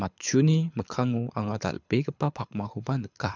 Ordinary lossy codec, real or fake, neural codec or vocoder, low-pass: none; fake; codec, 24 kHz, 6 kbps, HILCodec; 7.2 kHz